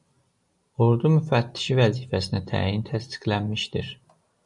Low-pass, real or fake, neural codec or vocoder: 10.8 kHz; real; none